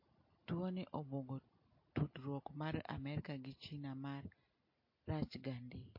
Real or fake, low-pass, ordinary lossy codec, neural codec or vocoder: real; 5.4 kHz; MP3, 32 kbps; none